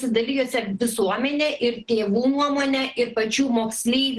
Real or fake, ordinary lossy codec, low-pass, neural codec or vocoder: real; Opus, 16 kbps; 10.8 kHz; none